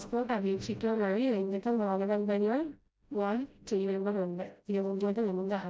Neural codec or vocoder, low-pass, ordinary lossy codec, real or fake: codec, 16 kHz, 0.5 kbps, FreqCodec, smaller model; none; none; fake